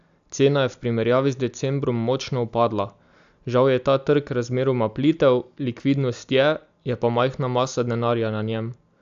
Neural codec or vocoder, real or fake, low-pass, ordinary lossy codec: none; real; 7.2 kHz; AAC, 96 kbps